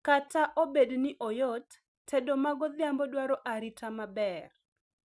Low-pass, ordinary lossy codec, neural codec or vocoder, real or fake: none; none; none; real